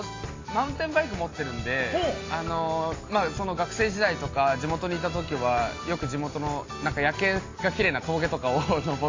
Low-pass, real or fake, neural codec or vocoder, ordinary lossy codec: 7.2 kHz; real; none; AAC, 32 kbps